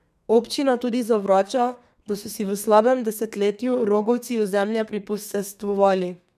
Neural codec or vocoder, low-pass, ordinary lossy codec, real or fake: codec, 32 kHz, 1.9 kbps, SNAC; 14.4 kHz; none; fake